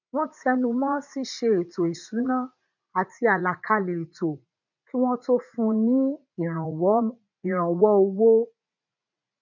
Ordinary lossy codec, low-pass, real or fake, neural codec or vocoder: none; 7.2 kHz; fake; vocoder, 44.1 kHz, 80 mel bands, Vocos